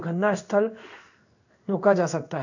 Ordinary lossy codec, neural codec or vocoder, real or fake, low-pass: none; codec, 16 kHz in and 24 kHz out, 1 kbps, XY-Tokenizer; fake; 7.2 kHz